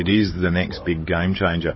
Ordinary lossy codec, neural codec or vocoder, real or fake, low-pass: MP3, 24 kbps; codec, 16 kHz, 16 kbps, FunCodec, trained on Chinese and English, 50 frames a second; fake; 7.2 kHz